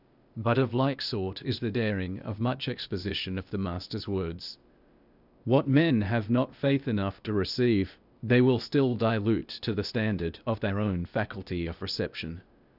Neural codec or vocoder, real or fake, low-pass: codec, 16 kHz, 0.8 kbps, ZipCodec; fake; 5.4 kHz